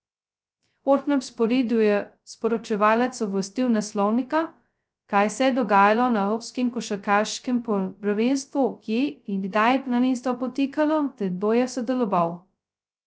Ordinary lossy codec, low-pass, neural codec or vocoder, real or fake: none; none; codec, 16 kHz, 0.2 kbps, FocalCodec; fake